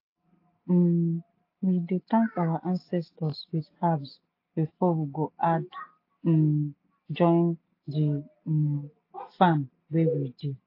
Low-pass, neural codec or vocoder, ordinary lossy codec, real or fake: 5.4 kHz; none; AAC, 32 kbps; real